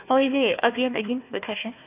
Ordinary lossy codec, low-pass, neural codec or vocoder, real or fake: AAC, 32 kbps; 3.6 kHz; codec, 16 kHz, 1 kbps, FunCodec, trained on Chinese and English, 50 frames a second; fake